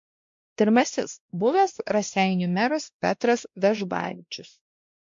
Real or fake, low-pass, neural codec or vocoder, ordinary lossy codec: fake; 7.2 kHz; codec, 16 kHz, 1 kbps, X-Codec, WavLM features, trained on Multilingual LibriSpeech; MP3, 48 kbps